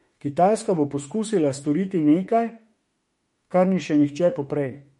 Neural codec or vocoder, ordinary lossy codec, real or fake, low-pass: autoencoder, 48 kHz, 32 numbers a frame, DAC-VAE, trained on Japanese speech; MP3, 48 kbps; fake; 19.8 kHz